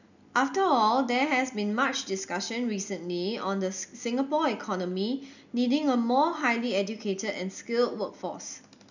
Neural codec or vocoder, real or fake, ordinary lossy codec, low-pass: none; real; none; 7.2 kHz